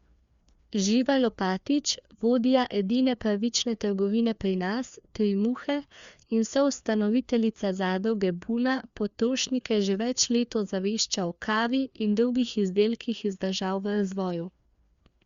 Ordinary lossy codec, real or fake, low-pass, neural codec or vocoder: Opus, 64 kbps; fake; 7.2 kHz; codec, 16 kHz, 2 kbps, FreqCodec, larger model